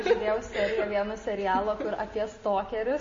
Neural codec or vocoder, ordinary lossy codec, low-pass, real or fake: none; MP3, 32 kbps; 7.2 kHz; real